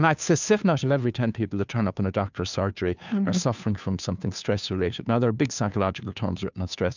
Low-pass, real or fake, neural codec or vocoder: 7.2 kHz; fake; codec, 16 kHz, 2 kbps, FunCodec, trained on Chinese and English, 25 frames a second